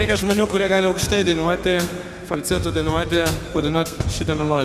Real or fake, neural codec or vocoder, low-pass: fake; codec, 32 kHz, 1.9 kbps, SNAC; 14.4 kHz